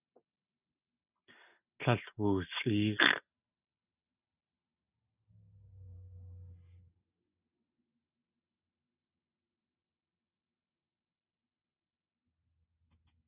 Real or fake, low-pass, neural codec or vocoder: real; 3.6 kHz; none